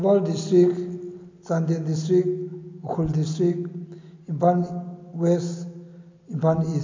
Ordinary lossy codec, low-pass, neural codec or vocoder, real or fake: MP3, 48 kbps; 7.2 kHz; none; real